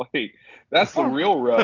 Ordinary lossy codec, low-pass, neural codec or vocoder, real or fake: AAC, 32 kbps; 7.2 kHz; none; real